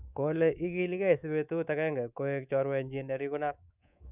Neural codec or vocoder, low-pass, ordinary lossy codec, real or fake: codec, 24 kHz, 3.1 kbps, DualCodec; 3.6 kHz; none; fake